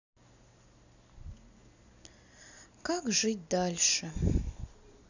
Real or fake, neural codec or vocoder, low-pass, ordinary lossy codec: real; none; 7.2 kHz; none